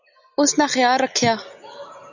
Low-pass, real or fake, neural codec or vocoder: 7.2 kHz; real; none